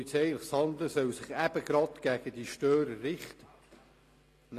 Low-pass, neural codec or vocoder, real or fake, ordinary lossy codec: 14.4 kHz; none; real; AAC, 48 kbps